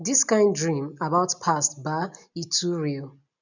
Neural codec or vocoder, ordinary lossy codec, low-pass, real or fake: none; none; 7.2 kHz; real